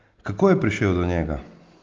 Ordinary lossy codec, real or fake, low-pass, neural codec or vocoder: Opus, 24 kbps; real; 7.2 kHz; none